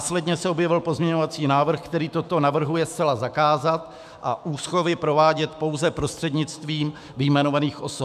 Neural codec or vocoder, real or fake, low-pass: autoencoder, 48 kHz, 128 numbers a frame, DAC-VAE, trained on Japanese speech; fake; 14.4 kHz